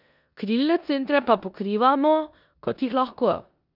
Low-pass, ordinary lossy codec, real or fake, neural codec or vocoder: 5.4 kHz; none; fake; codec, 16 kHz in and 24 kHz out, 0.9 kbps, LongCat-Audio-Codec, four codebook decoder